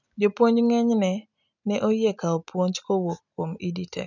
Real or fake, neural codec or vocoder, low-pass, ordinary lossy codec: real; none; 7.2 kHz; none